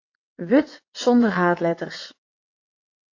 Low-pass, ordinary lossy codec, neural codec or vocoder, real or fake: 7.2 kHz; AAC, 48 kbps; vocoder, 22.05 kHz, 80 mel bands, WaveNeXt; fake